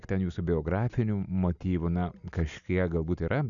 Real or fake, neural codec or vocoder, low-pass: real; none; 7.2 kHz